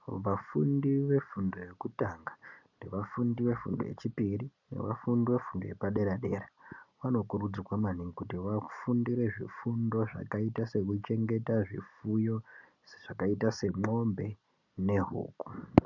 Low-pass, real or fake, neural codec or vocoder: 7.2 kHz; real; none